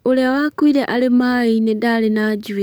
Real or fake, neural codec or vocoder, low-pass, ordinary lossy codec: fake; codec, 44.1 kHz, 7.8 kbps, DAC; none; none